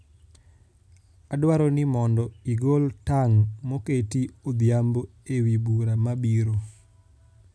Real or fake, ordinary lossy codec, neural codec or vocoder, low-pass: real; none; none; none